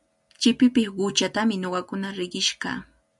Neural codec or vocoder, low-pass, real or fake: none; 10.8 kHz; real